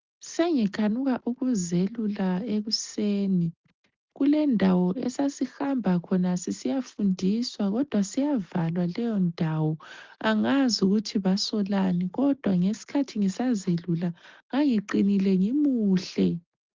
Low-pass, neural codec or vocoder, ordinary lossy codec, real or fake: 7.2 kHz; none; Opus, 32 kbps; real